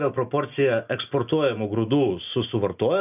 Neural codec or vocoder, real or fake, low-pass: none; real; 3.6 kHz